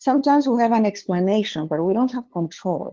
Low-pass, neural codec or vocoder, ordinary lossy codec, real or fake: 7.2 kHz; codec, 16 kHz, 2 kbps, FunCodec, trained on LibriTTS, 25 frames a second; Opus, 16 kbps; fake